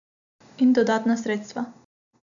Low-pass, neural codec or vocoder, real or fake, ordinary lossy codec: 7.2 kHz; none; real; MP3, 96 kbps